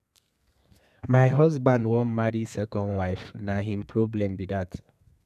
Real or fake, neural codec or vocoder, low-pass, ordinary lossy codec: fake; codec, 32 kHz, 1.9 kbps, SNAC; 14.4 kHz; none